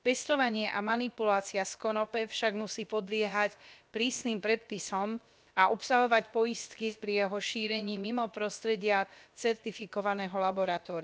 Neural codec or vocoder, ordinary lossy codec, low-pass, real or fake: codec, 16 kHz, about 1 kbps, DyCAST, with the encoder's durations; none; none; fake